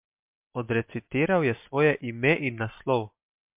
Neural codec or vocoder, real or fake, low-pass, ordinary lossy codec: none; real; 3.6 kHz; MP3, 32 kbps